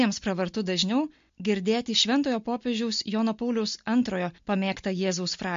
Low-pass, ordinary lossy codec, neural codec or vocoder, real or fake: 7.2 kHz; MP3, 48 kbps; none; real